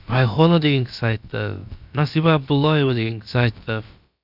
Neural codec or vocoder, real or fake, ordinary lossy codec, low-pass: codec, 16 kHz, about 1 kbps, DyCAST, with the encoder's durations; fake; none; 5.4 kHz